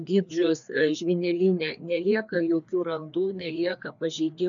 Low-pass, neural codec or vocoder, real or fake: 7.2 kHz; codec, 16 kHz, 2 kbps, FreqCodec, larger model; fake